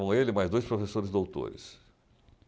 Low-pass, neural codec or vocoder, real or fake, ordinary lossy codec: none; none; real; none